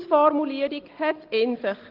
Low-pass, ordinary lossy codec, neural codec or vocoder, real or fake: 5.4 kHz; Opus, 16 kbps; none; real